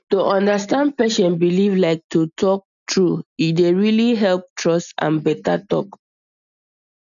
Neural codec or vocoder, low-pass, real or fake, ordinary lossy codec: none; 7.2 kHz; real; none